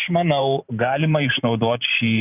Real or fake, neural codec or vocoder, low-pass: fake; vocoder, 44.1 kHz, 128 mel bands every 512 samples, BigVGAN v2; 3.6 kHz